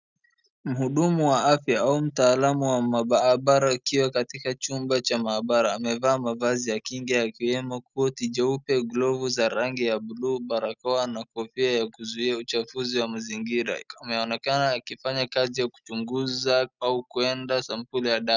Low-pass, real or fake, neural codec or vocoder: 7.2 kHz; real; none